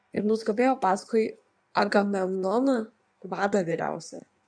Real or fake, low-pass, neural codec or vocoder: fake; 9.9 kHz; codec, 16 kHz in and 24 kHz out, 1.1 kbps, FireRedTTS-2 codec